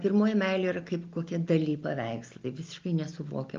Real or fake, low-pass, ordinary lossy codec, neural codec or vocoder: real; 7.2 kHz; Opus, 24 kbps; none